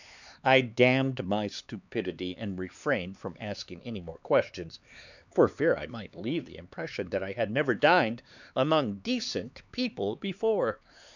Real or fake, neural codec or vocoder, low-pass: fake; codec, 16 kHz, 4 kbps, X-Codec, HuBERT features, trained on LibriSpeech; 7.2 kHz